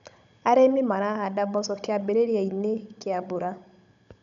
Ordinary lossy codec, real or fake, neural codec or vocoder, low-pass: none; fake; codec, 16 kHz, 16 kbps, FunCodec, trained on Chinese and English, 50 frames a second; 7.2 kHz